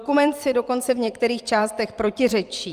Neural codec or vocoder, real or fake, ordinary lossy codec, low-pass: vocoder, 44.1 kHz, 128 mel bands every 256 samples, BigVGAN v2; fake; Opus, 32 kbps; 14.4 kHz